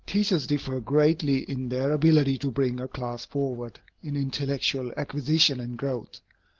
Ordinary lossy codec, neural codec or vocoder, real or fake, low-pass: Opus, 16 kbps; none; real; 7.2 kHz